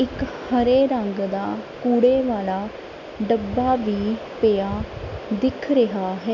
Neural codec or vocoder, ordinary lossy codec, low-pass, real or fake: none; none; 7.2 kHz; real